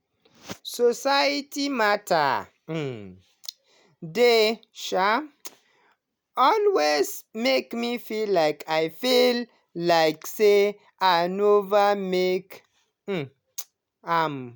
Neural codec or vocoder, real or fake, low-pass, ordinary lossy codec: none; real; none; none